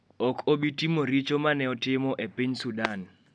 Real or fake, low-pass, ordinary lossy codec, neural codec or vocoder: real; none; none; none